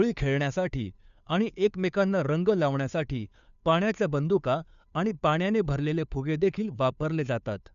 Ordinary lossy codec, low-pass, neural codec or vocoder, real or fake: none; 7.2 kHz; codec, 16 kHz, 2 kbps, FunCodec, trained on Chinese and English, 25 frames a second; fake